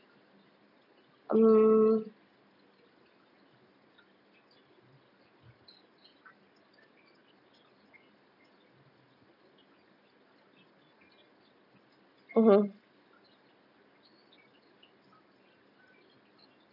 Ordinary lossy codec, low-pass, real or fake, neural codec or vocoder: none; 5.4 kHz; real; none